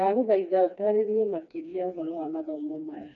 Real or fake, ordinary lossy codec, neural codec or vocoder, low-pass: fake; none; codec, 16 kHz, 2 kbps, FreqCodec, smaller model; 7.2 kHz